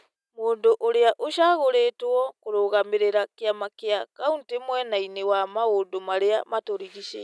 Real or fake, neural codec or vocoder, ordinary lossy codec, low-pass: real; none; none; none